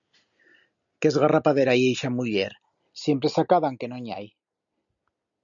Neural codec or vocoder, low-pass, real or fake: none; 7.2 kHz; real